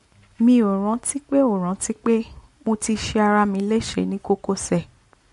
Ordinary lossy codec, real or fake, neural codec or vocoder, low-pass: MP3, 48 kbps; real; none; 14.4 kHz